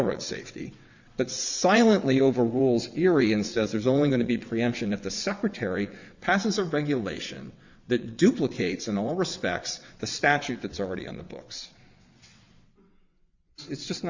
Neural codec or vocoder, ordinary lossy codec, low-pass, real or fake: vocoder, 22.05 kHz, 80 mel bands, WaveNeXt; Opus, 64 kbps; 7.2 kHz; fake